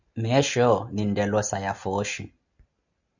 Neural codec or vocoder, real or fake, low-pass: none; real; 7.2 kHz